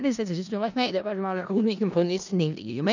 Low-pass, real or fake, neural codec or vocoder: 7.2 kHz; fake; codec, 16 kHz in and 24 kHz out, 0.4 kbps, LongCat-Audio-Codec, four codebook decoder